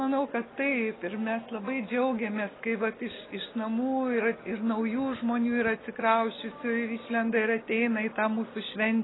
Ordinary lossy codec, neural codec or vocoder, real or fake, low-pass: AAC, 16 kbps; none; real; 7.2 kHz